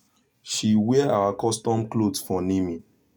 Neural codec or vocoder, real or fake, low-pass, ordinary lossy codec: none; real; none; none